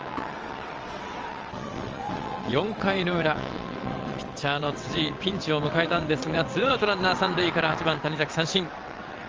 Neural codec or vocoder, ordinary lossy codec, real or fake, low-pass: vocoder, 22.05 kHz, 80 mel bands, WaveNeXt; Opus, 24 kbps; fake; 7.2 kHz